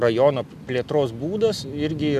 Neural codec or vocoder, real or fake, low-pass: none; real; 14.4 kHz